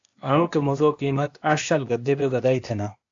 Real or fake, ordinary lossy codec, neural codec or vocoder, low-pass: fake; AAC, 64 kbps; codec, 16 kHz, 0.8 kbps, ZipCodec; 7.2 kHz